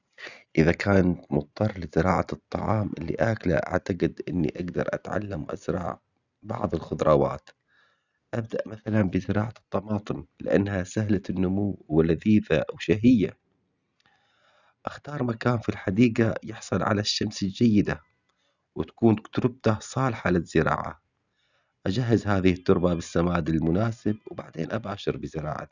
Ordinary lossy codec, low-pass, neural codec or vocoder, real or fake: none; 7.2 kHz; none; real